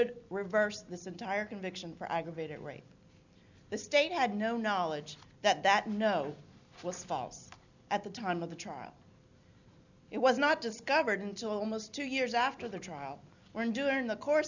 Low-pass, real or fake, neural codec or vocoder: 7.2 kHz; real; none